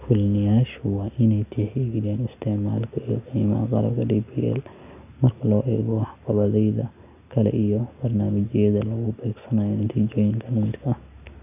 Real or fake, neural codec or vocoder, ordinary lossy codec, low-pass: real; none; none; 3.6 kHz